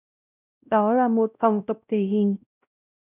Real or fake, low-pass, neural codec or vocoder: fake; 3.6 kHz; codec, 16 kHz, 0.5 kbps, X-Codec, WavLM features, trained on Multilingual LibriSpeech